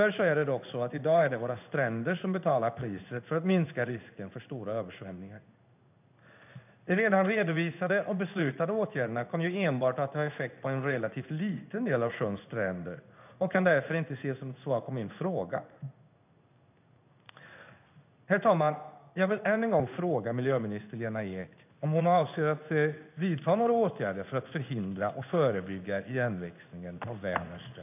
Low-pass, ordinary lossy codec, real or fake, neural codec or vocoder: 3.6 kHz; none; fake; codec, 16 kHz in and 24 kHz out, 1 kbps, XY-Tokenizer